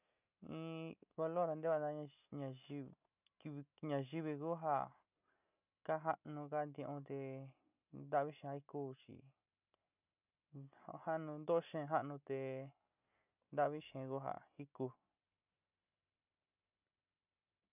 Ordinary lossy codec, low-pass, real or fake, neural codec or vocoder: none; 3.6 kHz; real; none